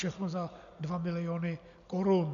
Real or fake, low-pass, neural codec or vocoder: real; 7.2 kHz; none